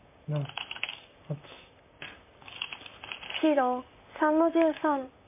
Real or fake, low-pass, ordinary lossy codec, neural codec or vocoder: fake; 3.6 kHz; MP3, 24 kbps; vocoder, 44.1 kHz, 128 mel bands, Pupu-Vocoder